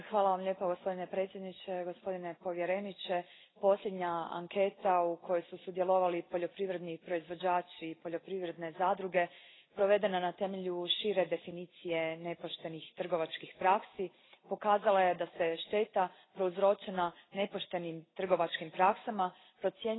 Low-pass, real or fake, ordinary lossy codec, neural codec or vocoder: 7.2 kHz; real; AAC, 16 kbps; none